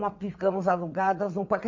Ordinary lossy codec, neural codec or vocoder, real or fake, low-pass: MP3, 48 kbps; vocoder, 22.05 kHz, 80 mel bands, WaveNeXt; fake; 7.2 kHz